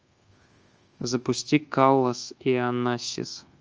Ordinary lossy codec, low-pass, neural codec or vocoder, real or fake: Opus, 24 kbps; 7.2 kHz; codec, 24 kHz, 1.2 kbps, DualCodec; fake